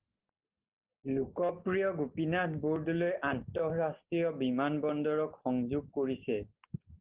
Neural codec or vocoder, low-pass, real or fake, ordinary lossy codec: none; 3.6 kHz; real; Opus, 16 kbps